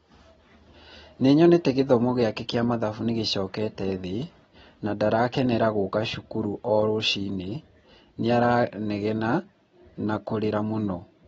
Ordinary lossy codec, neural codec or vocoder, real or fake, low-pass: AAC, 24 kbps; none; real; 19.8 kHz